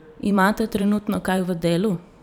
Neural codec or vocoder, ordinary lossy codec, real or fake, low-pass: vocoder, 44.1 kHz, 128 mel bands every 512 samples, BigVGAN v2; none; fake; 19.8 kHz